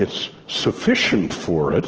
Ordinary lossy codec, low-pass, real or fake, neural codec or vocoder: Opus, 16 kbps; 7.2 kHz; real; none